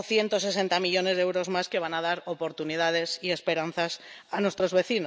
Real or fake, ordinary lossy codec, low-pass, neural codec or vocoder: real; none; none; none